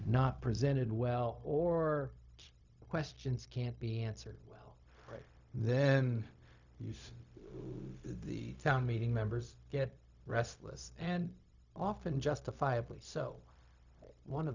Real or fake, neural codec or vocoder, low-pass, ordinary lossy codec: fake; codec, 16 kHz, 0.4 kbps, LongCat-Audio-Codec; 7.2 kHz; Opus, 64 kbps